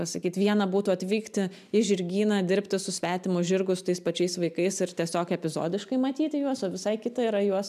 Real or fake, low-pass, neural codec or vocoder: real; 14.4 kHz; none